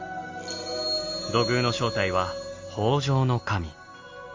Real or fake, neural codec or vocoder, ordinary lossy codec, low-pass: real; none; Opus, 32 kbps; 7.2 kHz